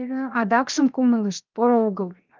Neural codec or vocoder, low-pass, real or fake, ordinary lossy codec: codec, 16 kHz, 0.7 kbps, FocalCodec; 7.2 kHz; fake; Opus, 24 kbps